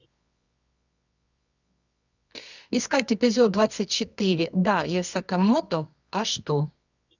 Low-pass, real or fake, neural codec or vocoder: 7.2 kHz; fake; codec, 24 kHz, 0.9 kbps, WavTokenizer, medium music audio release